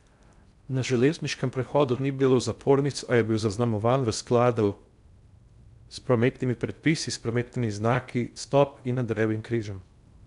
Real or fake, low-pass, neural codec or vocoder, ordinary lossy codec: fake; 10.8 kHz; codec, 16 kHz in and 24 kHz out, 0.6 kbps, FocalCodec, streaming, 4096 codes; MP3, 96 kbps